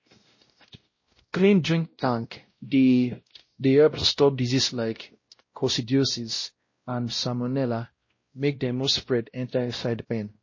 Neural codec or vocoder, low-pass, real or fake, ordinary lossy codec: codec, 16 kHz, 0.5 kbps, X-Codec, WavLM features, trained on Multilingual LibriSpeech; 7.2 kHz; fake; MP3, 32 kbps